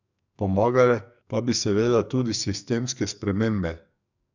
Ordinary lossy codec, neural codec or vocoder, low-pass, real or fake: none; codec, 44.1 kHz, 2.6 kbps, SNAC; 7.2 kHz; fake